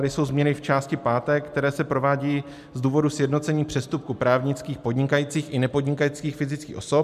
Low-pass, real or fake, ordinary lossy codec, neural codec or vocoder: 14.4 kHz; real; MP3, 96 kbps; none